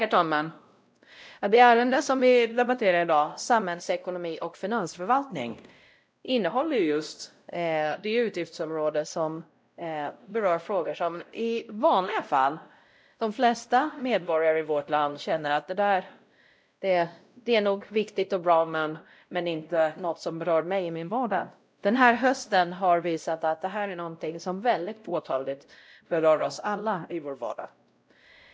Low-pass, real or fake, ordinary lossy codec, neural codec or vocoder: none; fake; none; codec, 16 kHz, 0.5 kbps, X-Codec, WavLM features, trained on Multilingual LibriSpeech